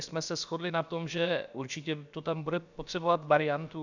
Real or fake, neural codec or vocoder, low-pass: fake; codec, 16 kHz, about 1 kbps, DyCAST, with the encoder's durations; 7.2 kHz